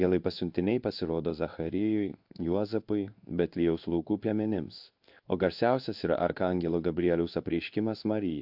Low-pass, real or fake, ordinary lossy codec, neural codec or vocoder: 5.4 kHz; fake; AAC, 48 kbps; codec, 16 kHz in and 24 kHz out, 1 kbps, XY-Tokenizer